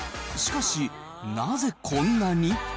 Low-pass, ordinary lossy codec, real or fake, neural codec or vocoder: none; none; real; none